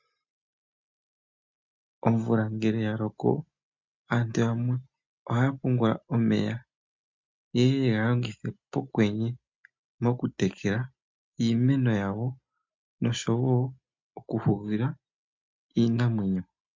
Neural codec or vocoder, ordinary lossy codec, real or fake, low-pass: none; MP3, 64 kbps; real; 7.2 kHz